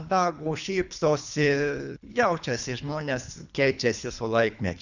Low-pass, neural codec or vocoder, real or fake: 7.2 kHz; codec, 24 kHz, 3 kbps, HILCodec; fake